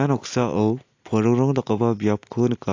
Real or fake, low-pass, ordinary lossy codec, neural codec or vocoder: real; 7.2 kHz; AAC, 48 kbps; none